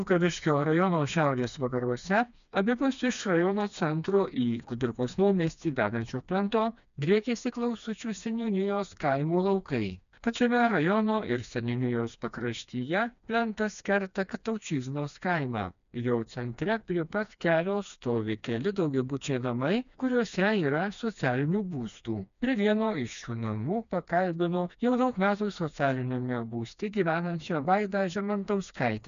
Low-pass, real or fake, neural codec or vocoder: 7.2 kHz; fake; codec, 16 kHz, 2 kbps, FreqCodec, smaller model